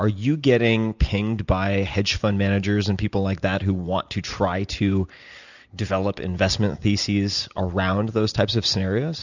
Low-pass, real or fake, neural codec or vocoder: 7.2 kHz; real; none